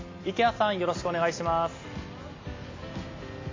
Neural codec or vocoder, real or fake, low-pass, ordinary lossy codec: none; real; 7.2 kHz; AAC, 32 kbps